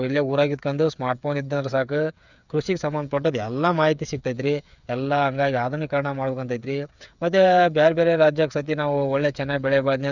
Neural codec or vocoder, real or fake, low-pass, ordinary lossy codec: codec, 16 kHz, 8 kbps, FreqCodec, smaller model; fake; 7.2 kHz; none